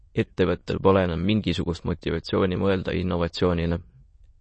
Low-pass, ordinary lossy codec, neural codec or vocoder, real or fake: 9.9 kHz; MP3, 32 kbps; autoencoder, 22.05 kHz, a latent of 192 numbers a frame, VITS, trained on many speakers; fake